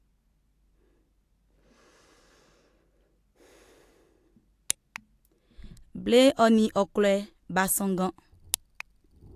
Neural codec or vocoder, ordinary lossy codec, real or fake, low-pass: none; AAC, 64 kbps; real; 14.4 kHz